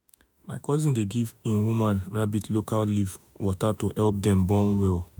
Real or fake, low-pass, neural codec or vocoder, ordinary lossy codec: fake; none; autoencoder, 48 kHz, 32 numbers a frame, DAC-VAE, trained on Japanese speech; none